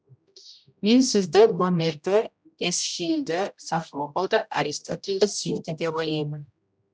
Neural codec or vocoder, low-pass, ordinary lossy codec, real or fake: codec, 16 kHz, 0.5 kbps, X-Codec, HuBERT features, trained on general audio; none; none; fake